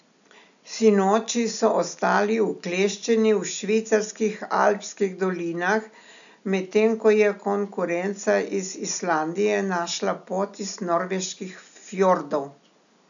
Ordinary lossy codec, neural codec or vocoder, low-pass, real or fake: none; none; 7.2 kHz; real